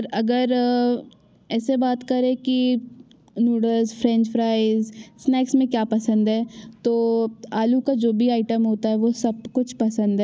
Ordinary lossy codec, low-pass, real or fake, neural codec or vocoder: none; none; real; none